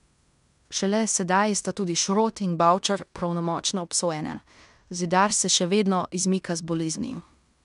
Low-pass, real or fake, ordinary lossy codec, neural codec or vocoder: 10.8 kHz; fake; none; codec, 16 kHz in and 24 kHz out, 0.9 kbps, LongCat-Audio-Codec, fine tuned four codebook decoder